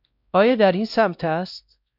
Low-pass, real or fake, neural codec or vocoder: 5.4 kHz; fake; codec, 16 kHz, 1 kbps, X-Codec, WavLM features, trained on Multilingual LibriSpeech